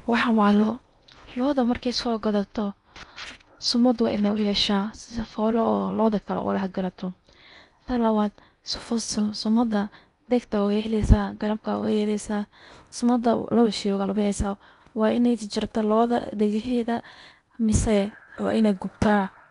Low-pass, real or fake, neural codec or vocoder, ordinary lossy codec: 10.8 kHz; fake; codec, 16 kHz in and 24 kHz out, 0.8 kbps, FocalCodec, streaming, 65536 codes; none